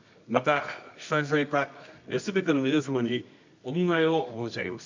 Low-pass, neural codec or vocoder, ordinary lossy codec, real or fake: 7.2 kHz; codec, 24 kHz, 0.9 kbps, WavTokenizer, medium music audio release; MP3, 64 kbps; fake